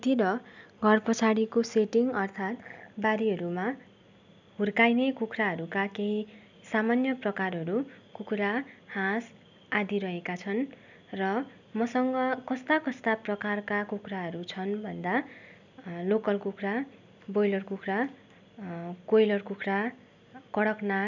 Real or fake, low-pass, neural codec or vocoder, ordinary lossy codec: real; 7.2 kHz; none; none